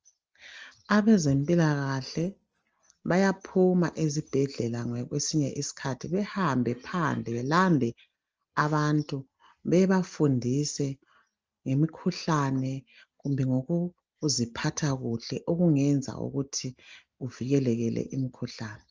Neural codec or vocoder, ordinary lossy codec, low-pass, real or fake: none; Opus, 24 kbps; 7.2 kHz; real